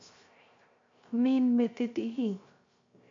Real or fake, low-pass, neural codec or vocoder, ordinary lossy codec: fake; 7.2 kHz; codec, 16 kHz, 0.3 kbps, FocalCodec; MP3, 48 kbps